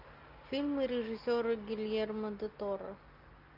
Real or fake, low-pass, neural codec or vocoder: real; 5.4 kHz; none